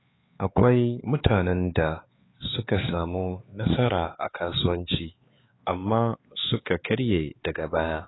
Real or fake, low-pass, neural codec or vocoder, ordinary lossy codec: fake; 7.2 kHz; codec, 16 kHz, 4 kbps, X-Codec, HuBERT features, trained on LibriSpeech; AAC, 16 kbps